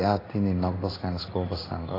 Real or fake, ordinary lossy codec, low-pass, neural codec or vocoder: fake; AAC, 24 kbps; 5.4 kHz; codec, 16 kHz in and 24 kHz out, 1 kbps, XY-Tokenizer